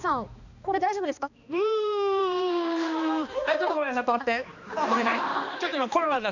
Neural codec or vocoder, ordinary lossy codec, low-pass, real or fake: codec, 16 kHz, 2 kbps, X-Codec, HuBERT features, trained on balanced general audio; none; 7.2 kHz; fake